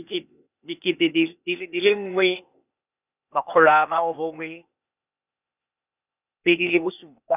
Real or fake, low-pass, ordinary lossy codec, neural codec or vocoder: fake; 3.6 kHz; none; codec, 16 kHz, 0.8 kbps, ZipCodec